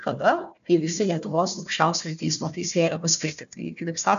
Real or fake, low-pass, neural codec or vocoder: fake; 7.2 kHz; codec, 16 kHz, 1 kbps, FunCodec, trained on Chinese and English, 50 frames a second